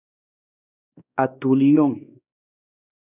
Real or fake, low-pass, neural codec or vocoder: fake; 3.6 kHz; codec, 16 kHz, 2 kbps, X-Codec, WavLM features, trained on Multilingual LibriSpeech